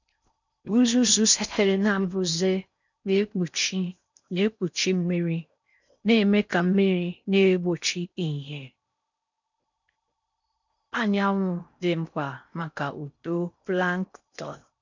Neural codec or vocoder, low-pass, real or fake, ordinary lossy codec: codec, 16 kHz in and 24 kHz out, 0.6 kbps, FocalCodec, streaming, 4096 codes; 7.2 kHz; fake; none